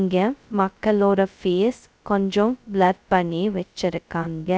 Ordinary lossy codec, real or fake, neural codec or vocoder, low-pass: none; fake; codec, 16 kHz, 0.2 kbps, FocalCodec; none